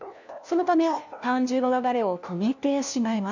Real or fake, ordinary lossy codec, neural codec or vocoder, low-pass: fake; none; codec, 16 kHz, 1 kbps, FunCodec, trained on LibriTTS, 50 frames a second; 7.2 kHz